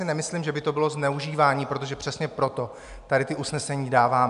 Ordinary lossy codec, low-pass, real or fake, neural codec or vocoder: MP3, 96 kbps; 10.8 kHz; real; none